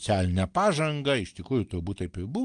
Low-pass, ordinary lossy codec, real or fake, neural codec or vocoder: 9.9 kHz; Opus, 24 kbps; real; none